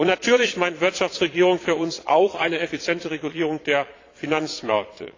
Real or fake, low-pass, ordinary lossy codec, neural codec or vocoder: fake; 7.2 kHz; AAC, 32 kbps; vocoder, 22.05 kHz, 80 mel bands, Vocos